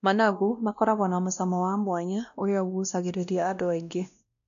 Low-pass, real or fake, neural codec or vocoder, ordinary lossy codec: 7.2 kHz; fake; codec, 16 kHz, 1 kbps, X-Codec, WavLM features, trained on Multilingual LibriSpeech; MP3, 96 kbps